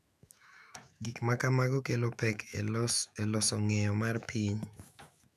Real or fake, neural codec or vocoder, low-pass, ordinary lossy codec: fake; autoencoder, 48 kHz, 128 numbers a frame, DAC-VAE, trained on Japanese speech; 14.4 kHz; none